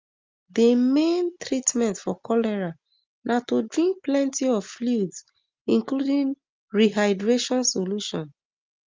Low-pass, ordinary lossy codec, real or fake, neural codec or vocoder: 7.2 kHz; Opus, 32 kbps; real; none